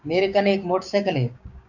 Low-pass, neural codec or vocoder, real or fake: 7.2 kHz; codec, 16 kHz, 6 kbps, DAC; fake